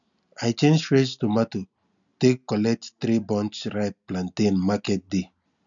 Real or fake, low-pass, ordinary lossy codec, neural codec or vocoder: real; 7.2 kHz; none; none